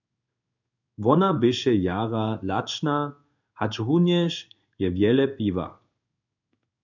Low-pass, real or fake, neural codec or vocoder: 7.2 kHz; fake; codec, 16 kHz in and 24 kHz out, 1 kbps, XY-Tokenizer